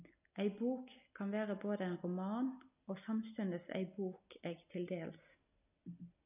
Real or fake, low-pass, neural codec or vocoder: real; 3.6 kHz; none